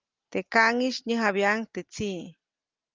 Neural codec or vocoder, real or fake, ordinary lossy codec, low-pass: none; real; Opus, 24 kbps; 7.2 kHz